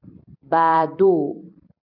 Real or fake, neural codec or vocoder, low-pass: fake; vocoder, 44.1 kHz, 128 mel bands every 256 samples, BigVGAN v2; 5.4 kHz